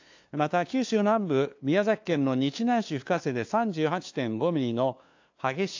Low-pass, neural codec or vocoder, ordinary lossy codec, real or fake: 7.2 kHz; codec, 16 kHz, 2 kbps, FunCodec, trained on LibriTTS, 25 frames a second; AAC, 48 kbps; fake